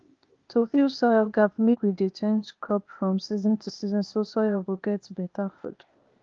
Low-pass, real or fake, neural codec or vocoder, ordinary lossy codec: 7.2 kHz; fake; codec, 16 kHz, 0.8 kbps, ZipCodec; Opus, 32 kbps